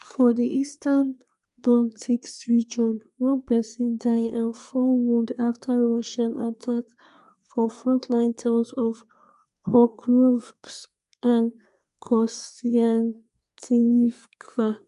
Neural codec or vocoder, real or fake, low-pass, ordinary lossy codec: codec, 24 kHz, 1 kbps, SNAC; fake; 10.8 kHz; none